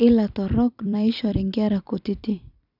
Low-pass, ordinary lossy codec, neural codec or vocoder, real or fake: 5.4 kHz; none; vocoder, 44.1 kHz, 128 mel bands every 256 samples, BigVGAN v2; fake